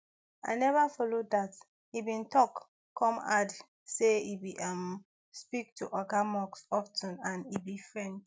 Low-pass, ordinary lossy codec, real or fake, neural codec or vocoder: none; none; real; none